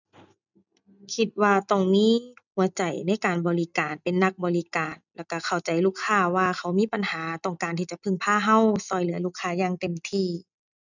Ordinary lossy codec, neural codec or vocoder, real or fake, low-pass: none; none; real; 7.2 kHz